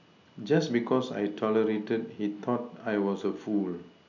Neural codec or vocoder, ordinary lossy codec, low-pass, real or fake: none; none; 7.2 kHz; real